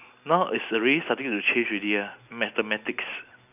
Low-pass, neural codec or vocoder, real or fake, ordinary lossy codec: 3.6 kHz; none; real; none